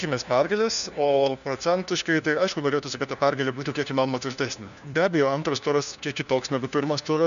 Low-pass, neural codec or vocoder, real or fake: 7.2 kHz; codec, 16 kHz, 1 kbps, FunCodec, trained on LibriTTS, 50 frames a second; fake